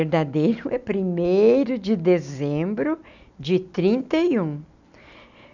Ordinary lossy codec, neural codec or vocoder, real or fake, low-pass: none; none; real; 7.2 kHz